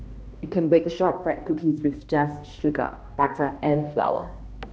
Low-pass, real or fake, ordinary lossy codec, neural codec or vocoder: none; fake; none; codec, 16 kHz, 1 kbps, X-Codec, HuBERT features, trained on balanced general audio